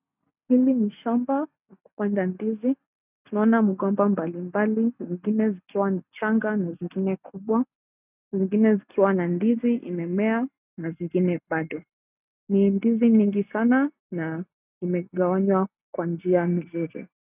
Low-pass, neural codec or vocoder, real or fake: 3.6 kHz; none; real